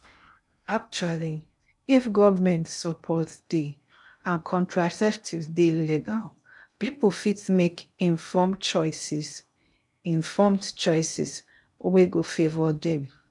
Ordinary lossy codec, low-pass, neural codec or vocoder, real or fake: none; 10.8 kHz; codec, 16 kHz in and 24 kHz out, 0.6 kbps, FocalCodec, streaming, 2048 codes; fake